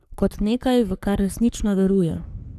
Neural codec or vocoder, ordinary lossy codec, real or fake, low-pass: codec, 44.1 kHz, 3.4 kbps, Pupu-Codec; none; fake; 14.4 kHz